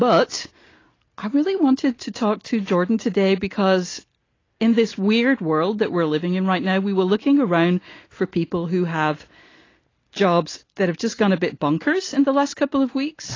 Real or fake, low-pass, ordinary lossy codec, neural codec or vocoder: real; 7.2 kHz; AAC, 32 kbps; none